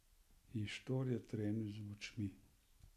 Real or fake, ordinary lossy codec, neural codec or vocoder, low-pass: real; none; none; 14.4 kHz